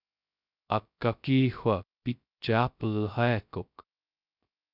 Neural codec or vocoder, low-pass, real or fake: codec, 16 kHz, 0.2 kbps, FocalCodec; 5.4 kHz; fake